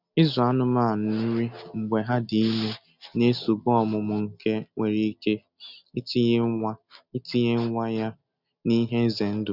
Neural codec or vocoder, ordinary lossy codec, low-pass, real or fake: none; none; 5.4 kHz; real